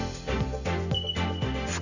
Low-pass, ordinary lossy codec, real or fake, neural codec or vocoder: 7.2 kHz; none; real; none